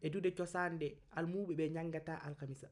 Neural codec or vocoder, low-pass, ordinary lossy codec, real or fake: none; 10.8 kHz; none; real